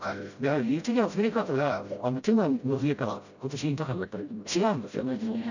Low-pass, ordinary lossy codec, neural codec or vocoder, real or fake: 7.2 kHz; none; codec, 16 kHz, 0.5 kbps, FreqCodec, smaller model; fake